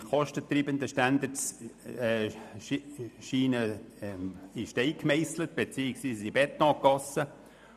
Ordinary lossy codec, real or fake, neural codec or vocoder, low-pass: none; fake; vocoder, 44.1 kHz, 128 mel bands every 512 samples, BigVGAN v2; 14.4 kHz